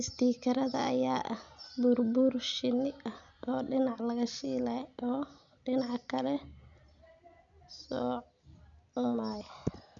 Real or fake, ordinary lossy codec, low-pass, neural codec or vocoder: real; none; 7.2 kHz; none